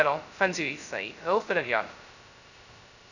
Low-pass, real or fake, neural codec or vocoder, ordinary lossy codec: 7.2 kHz; fake; codec, 16 kHz, 0.2 kbps, FocalCodec; none